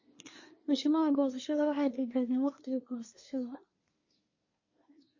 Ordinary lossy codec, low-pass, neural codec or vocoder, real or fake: MP3, 32 kbps; 7.2 kHz; codec, 24 kHz, 1 kbps, SNAC; fake